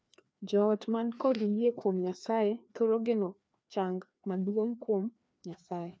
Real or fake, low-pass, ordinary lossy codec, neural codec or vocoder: fake; none; none; codec, 16 kHz, 2 kbps, FreqCodec, larger model